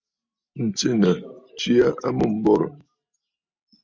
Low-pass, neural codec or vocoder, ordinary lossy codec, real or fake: 7.2 kHz; none; MP3, 64 kbps; real